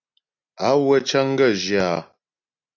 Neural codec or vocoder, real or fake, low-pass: none; real; 7.2 kHz